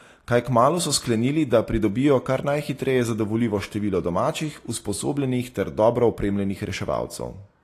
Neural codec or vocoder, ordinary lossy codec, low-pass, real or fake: none; AAC, 48 kbps; 14.4 kHz; real